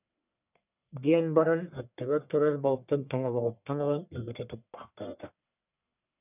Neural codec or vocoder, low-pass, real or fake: codec, 44.1 kHz, 1.7 kbps, Pupu-Codec; 3.6 kHz; fake